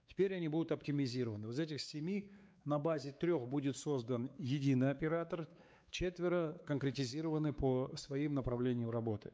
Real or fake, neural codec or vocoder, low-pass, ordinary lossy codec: fake; codec, 16 kHz, 4 kbps, X-Codec, WavLM features, trained on Multilingual LibriSpeech; none; none